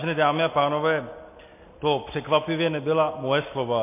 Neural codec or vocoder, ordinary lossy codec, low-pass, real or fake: none; MP3, 24 kbps; 3.6 kHz; real